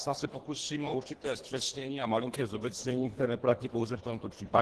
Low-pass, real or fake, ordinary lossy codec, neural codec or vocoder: 10.8 kHz; fake; Opus, 16 kbps; codec, 24 kHz, 1.5 kbps, HILCodec